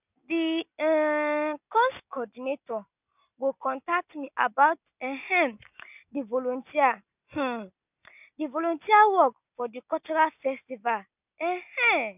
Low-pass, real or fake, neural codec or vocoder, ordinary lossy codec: 3.6 kHz; real; none; none